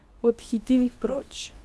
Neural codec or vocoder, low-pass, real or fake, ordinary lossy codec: codec, 24 kHz, 0.9 kbps, WavTokenizer, medium speech release version 2; none; fake; none